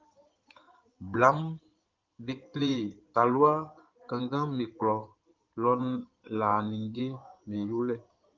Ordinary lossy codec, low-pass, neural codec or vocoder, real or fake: Opus, 32 kbps; 7.2 kHz; codec, 16 kHz in and 24 kHz out, 2.2 kbps, FireRedTTS-2 codec; fake